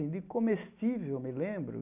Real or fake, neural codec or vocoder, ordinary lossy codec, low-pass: real; none; AAC, 32 kbps; 3.6 kHz